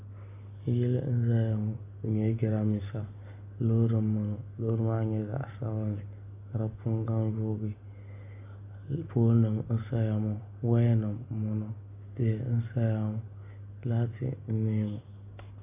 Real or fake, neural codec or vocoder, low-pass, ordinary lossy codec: real; none; 3.6 kHz; AAC, 32 kbps